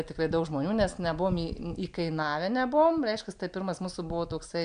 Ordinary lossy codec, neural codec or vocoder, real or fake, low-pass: AAC, 96 kbps; none; real; 9.9 kHz